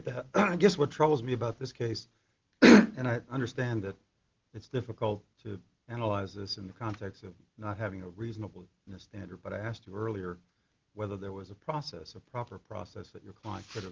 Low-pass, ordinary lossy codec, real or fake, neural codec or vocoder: 7.2 kHz; Opus, 16 kbps; real; none